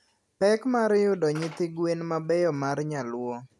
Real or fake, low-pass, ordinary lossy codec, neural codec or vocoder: real; none; none; none